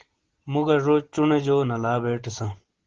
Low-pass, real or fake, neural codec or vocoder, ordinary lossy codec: 7.2 kHz; real; none; Opus, 16 kbps